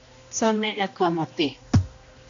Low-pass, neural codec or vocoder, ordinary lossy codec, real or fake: 7.2 kHz; codec, 16 kHz, 1 kbps, X-Codec, HuBERT features, trained on general audio; AAC, 48 kbps; fake